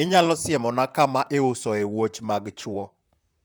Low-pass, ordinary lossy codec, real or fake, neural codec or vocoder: none; none; fake; vocoder, 44.1 kHz, 128 mel bands, Pupu-Vocoder